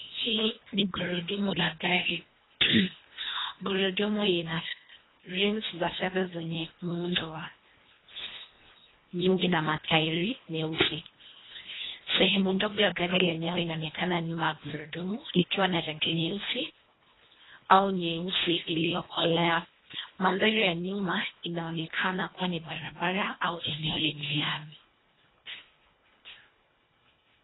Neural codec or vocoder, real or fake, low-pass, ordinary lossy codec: codec, 24 kHz, 1.5 kbps, HILCodec; fake; 7.2 kHz; AAC, 16 kbps